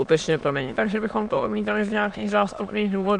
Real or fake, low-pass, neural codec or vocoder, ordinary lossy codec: fake; 9.9 kHz; autoencoder, 22.05 kHz, a latent of 192 numbers a frame, VITS, trained on many speakers; MP3, 96 kbps